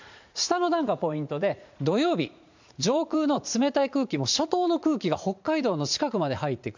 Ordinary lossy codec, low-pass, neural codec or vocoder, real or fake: MP3, 64 kbps; 7.2 kHz; vocoder, 44.1 kHz, 80 mel bands, Vocos; fake